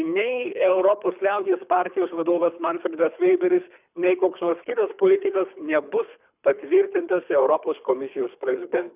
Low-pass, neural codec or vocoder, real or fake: 3.6 kHz; codec, 24 kHz, 3 kbps, HILCodec; fake